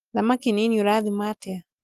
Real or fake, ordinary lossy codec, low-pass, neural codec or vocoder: real; Opus, 24 kbps; 14.4 kHz; none